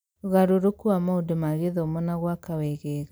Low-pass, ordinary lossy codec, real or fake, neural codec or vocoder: none; none; real; none